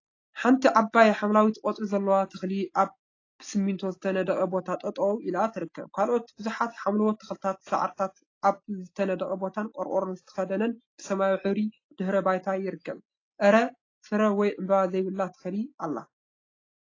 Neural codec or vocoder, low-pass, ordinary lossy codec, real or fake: none; 7.2 kHz; AAC, 32 kbps; real